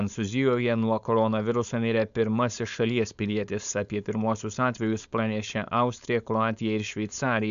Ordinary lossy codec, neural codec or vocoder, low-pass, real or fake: MP3, 96 kbps; codec, 16 kHz, 4.8 kbps, FACodec; 7.2 kHz; fake